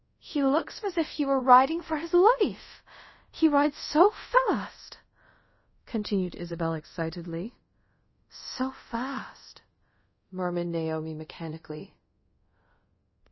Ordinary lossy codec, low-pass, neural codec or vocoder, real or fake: MP3, 24 kbps; 7.2 kHz; codec, 24 kHz, 0.5 kbps, DualCodec; fake